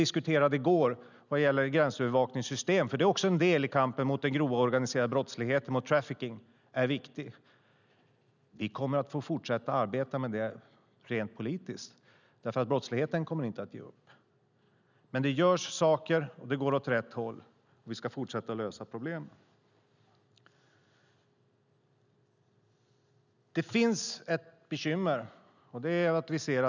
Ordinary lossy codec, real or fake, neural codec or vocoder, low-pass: none; real; none; 7.2 kHz